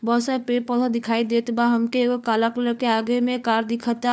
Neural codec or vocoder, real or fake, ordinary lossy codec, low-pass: codec, 16 kHz, 4 kbps, FunCodec, trained on LibriTTS, 50 frames a second; fake; none; none